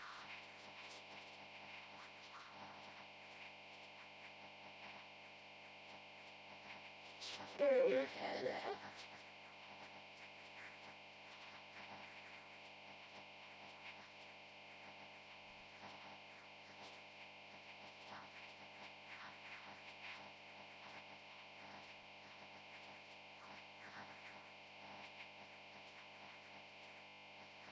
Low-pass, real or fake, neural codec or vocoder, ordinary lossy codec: none; fake; codec, 16 kHz, 0.5 kbps, FreqCodec, smaller model; none